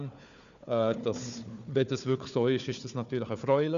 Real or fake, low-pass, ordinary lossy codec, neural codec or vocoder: fake; 7.2 kHz; none; codec, 16 kHz, 4 kbps, FunCodec, trained on Chinese and English, 50 frames a second